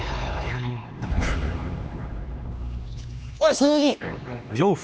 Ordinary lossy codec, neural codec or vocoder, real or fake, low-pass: none; codec, 16 kHz, 2 kbps, X-Codec, HuBERT features, trained on LibriSpeech; fake; none